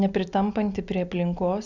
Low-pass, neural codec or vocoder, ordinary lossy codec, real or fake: 7.2 kHz; none; Opus, 64 kbps; real